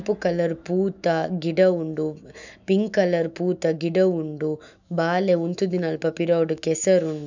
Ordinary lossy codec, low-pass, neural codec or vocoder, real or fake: none; 7.2 kHz; autoencoder, 48 kHz, 128 numbers a frame, DAC-VAE, trained on Japanese speech; fake